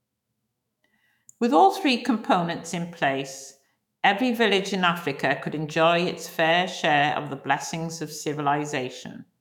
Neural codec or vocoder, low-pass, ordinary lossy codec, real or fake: autoencoder, 48 kHz, 128 numbers a frame, DAC-VAE, trained on Japanese speech; 19.8 kHz; none; fake